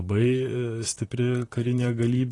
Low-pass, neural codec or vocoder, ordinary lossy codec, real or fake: 10.8 kHz; vocoder, 44.1 kHz, 128 mel bands, Pupu-Vocoder; AAC, 32 kbps; fake